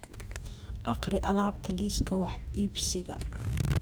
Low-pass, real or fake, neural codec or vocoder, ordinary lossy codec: none; fake; codec, 44.1 kHz, 2.6 kbps, DAC; none